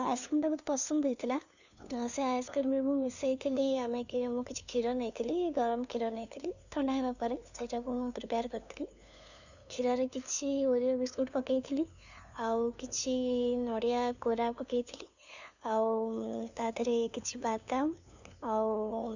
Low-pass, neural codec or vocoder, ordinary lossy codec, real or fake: 7.2 kHz; codec, 16 kHz, 2 kbps, FunCodec, trained on LibriTTS, 25 frames a second; none; fake